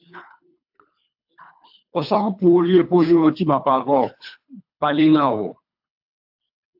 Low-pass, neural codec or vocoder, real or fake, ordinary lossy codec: 5.4 kHz; codec, 24 kHz, 3 kbps, HILCodec; fake; AAC, 48 kbps